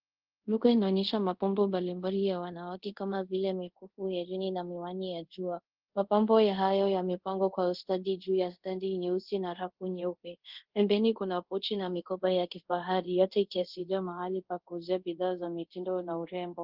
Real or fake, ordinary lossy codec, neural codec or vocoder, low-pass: fake; Opus, 16 kbps; codec, 24 kHz, 0.5 kbps, DualCodec; 5.4 kHz